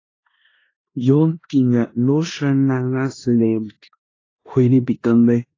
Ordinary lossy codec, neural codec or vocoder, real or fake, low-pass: AAC, 32 kbps; codec, 16 kHz in and 24 kHz out, 0.9 kbps, LongCat-Audio-Codec, four codebook decoder; fake; 7.2 kHz